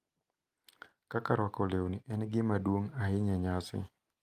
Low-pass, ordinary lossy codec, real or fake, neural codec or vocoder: 14.4 kHz; Opus, 32 kbps; real; none